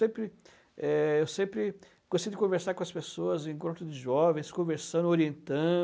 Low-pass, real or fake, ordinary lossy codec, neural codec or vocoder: none; real; none; none